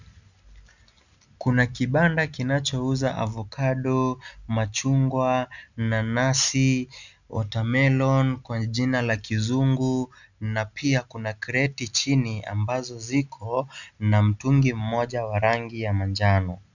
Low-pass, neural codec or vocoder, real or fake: 7.2 kHz; none; real